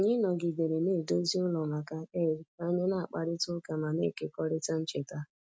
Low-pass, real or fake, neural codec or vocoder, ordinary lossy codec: none; real; none; none